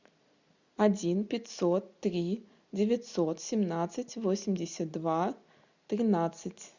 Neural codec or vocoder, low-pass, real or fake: none; 7.2 kHz; real